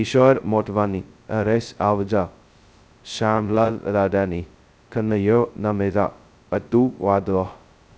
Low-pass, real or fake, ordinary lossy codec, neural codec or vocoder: none; fake; none; codec, 16 kHz, 0.2 kbps, FocalCodec